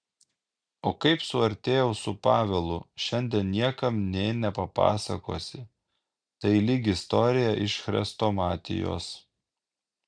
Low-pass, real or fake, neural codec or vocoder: 9.9 kHz; real; none